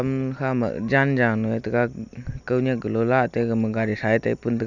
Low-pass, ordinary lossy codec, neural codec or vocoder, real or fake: 7.2 kHz; none; none; real